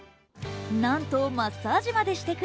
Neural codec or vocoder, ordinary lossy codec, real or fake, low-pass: none; none; real; none